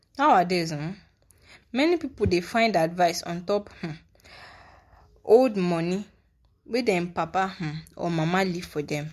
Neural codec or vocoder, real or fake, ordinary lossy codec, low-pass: none; real; MP3, 64 kbps; 14.4 kHz